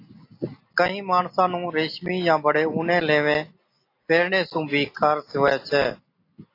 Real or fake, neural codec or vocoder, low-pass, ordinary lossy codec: real; none; 5.4 kHz; AAC, 32 kbps